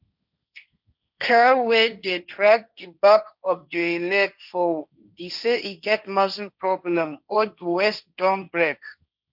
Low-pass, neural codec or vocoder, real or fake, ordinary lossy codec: 5.4 kHz; codec, 16 kHz, 1.1 kbps, Voila-Tokenizer; fake; none